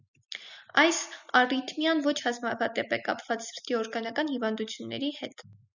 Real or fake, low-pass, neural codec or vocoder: real; 7.2 kHz; none